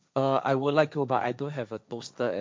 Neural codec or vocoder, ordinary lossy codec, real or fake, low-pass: codec, 16 kHz, 1.1 kbps, Voila-Tokenizer; none; fake; none